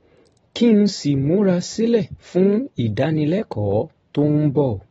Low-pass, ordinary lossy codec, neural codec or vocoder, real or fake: 19.8 kHz; AAC, 24 kbps; none; real